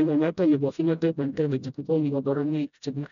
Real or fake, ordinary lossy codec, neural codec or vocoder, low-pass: fake; none; codec, 16 kHz, 0.5 kbps, FreqCodec, smaller model; 7.2 kHz